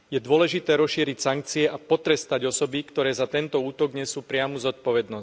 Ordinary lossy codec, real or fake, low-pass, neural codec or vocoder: none; real; none; none